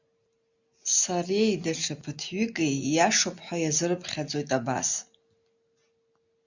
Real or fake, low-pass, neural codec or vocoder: real; 7.2 kHz; none